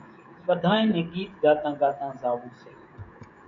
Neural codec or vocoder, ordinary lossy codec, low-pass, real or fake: codec, 16 kHz, 16 kbps, FreqCodec, smaller model; MP3, 48 kbps; 7.2 kHz; fake